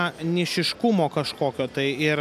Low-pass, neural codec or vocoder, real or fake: 14.4 kHz; none; real